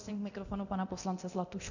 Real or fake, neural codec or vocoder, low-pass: fake; codec, 24 kHz, 0.9 kbps, DualCodec; 7.2 kHz